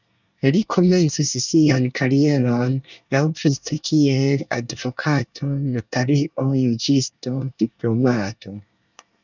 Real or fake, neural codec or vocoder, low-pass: fake; codec, 24 kHz, 1 kbps, SNAC; 7.2 kHz